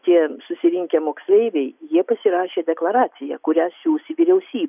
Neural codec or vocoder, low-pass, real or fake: none; 3.6 kHz; real